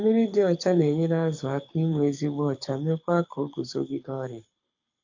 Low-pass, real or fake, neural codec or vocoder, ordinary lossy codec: 7.2 kHz; fake; codec, 24 kHz, 6 kbps, HILCodec; AAC, 48 kbps